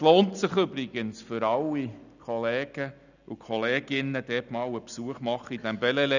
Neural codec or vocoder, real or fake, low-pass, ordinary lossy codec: none; real; 7.2 kHz; none